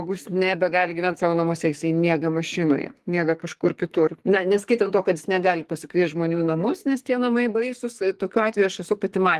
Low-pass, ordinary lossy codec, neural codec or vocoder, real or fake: 14.4 kHz; Opus, 24 kbps; codec, 44.1 kHz, 2.6 kbps, SNAC; fake